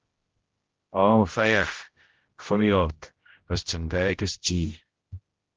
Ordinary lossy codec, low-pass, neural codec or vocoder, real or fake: Opus, 16 kbps; 7.2 kHz; codec, 16 kHz, 0.5 kbps, X-Codec, HuBERT features, trained on general audio; fake